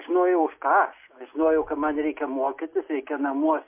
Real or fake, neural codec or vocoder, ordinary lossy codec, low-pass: real; none; MP3, 24 kbps; 3.6 kHz